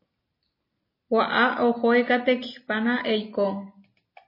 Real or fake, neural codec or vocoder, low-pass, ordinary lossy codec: real; none; 5.4 kHz; MP3, 24 kbps